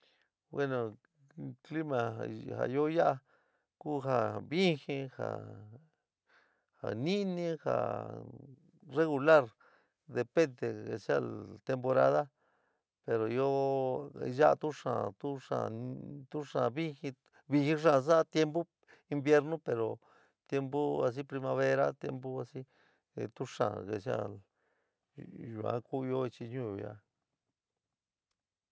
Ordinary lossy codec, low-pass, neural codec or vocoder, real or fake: none; none; none; real